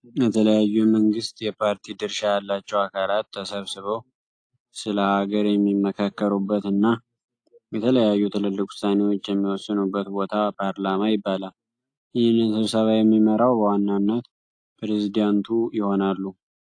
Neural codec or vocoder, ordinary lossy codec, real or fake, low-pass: none; AAC, 48 kbps; real; 9.9 kHz